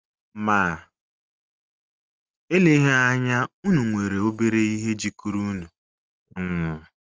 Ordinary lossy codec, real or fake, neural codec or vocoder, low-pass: Opus, 24 kbps; real; none; 7.2 kHz